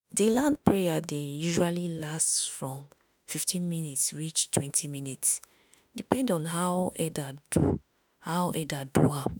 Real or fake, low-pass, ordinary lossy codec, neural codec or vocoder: fake; none; none; autoencoder, 48 kHz, 32 numbers a frame, DAC-VAE, trained on Japanese speech